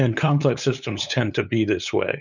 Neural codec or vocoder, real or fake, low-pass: codec, 16 kHz, 8 kbps, FunCodec, trained on LibriTTS, 25 frames a second; fake; 7.2 kHz